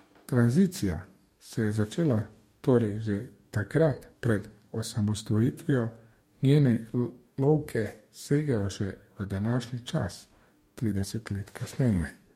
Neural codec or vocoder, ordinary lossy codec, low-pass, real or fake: codec, 44.1 kHz, 2.6 kbps, DAC; MP3, 64 kbps; 19.8 kHz; fake